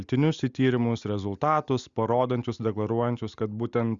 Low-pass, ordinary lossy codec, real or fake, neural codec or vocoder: 7.2 kHz; Opus, 64 kbps; real; none